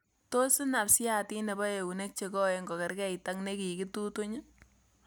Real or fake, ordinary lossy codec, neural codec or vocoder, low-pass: real; none; none; none